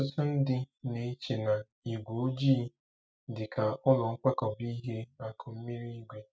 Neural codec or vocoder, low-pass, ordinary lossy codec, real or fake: none; none; none; real